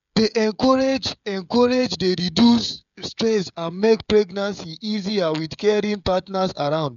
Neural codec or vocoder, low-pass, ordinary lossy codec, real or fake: codec, 16 kHz, 16 kbps, FreqCodec, smaller model; 7.2 kHz; none; fake